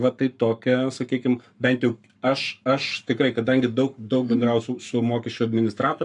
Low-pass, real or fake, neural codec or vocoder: 10.8 kHz; fake; codec, 44.1 kHz, 7.8 kbps, Pupu-Codec